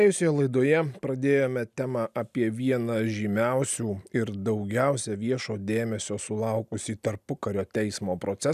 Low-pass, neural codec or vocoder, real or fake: 14.4 kHz; none; real